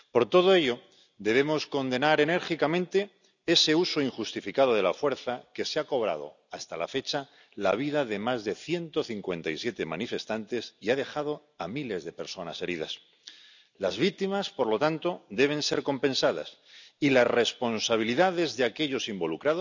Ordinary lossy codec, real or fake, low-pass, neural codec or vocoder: none; real; 7.2 kHz; none